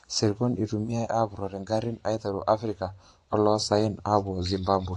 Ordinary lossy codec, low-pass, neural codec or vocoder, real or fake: AAC, 48 kbps; 10.8 kHz; vocoder, 24 kHz, 100 mel bands, Vocos; fake